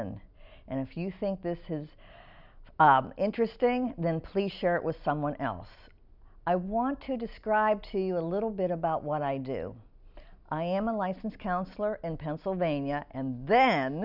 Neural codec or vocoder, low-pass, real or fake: none; 5.4 kHz; real